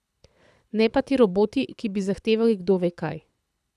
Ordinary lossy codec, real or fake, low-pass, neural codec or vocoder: none; fake; none; codec, 24 kHz, 6 kbps, HILCodec